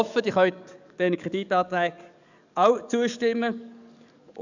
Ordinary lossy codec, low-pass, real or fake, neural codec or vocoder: none; 7.2 kHz; fake; codec, 44.1 kHz, 7.8 kbps, DAC